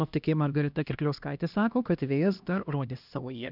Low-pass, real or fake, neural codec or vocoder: 5.4 kHz; fake; codec, 16 kHz, 1 kbps, X-Codec, HuBERT features, trained on LibriSpeech